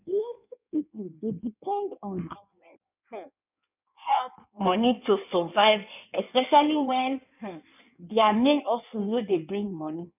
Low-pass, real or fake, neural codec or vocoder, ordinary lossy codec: 3.6 kHz; fake; codec, 16 kHz in and 24 kHz out, 1.1 kbps, FireRedTTS-2 codec; none